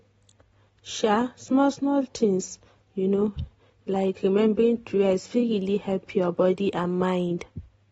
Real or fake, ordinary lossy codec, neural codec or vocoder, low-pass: real; AAC, 24 kbps; none; 19.8 kHz